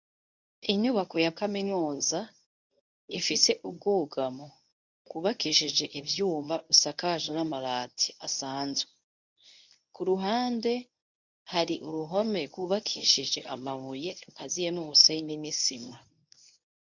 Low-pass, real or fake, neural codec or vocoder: 7.2 kHz; fake; codec, 24 kHz, 0.9 kbps, WavTokenizer, medium speech release version 1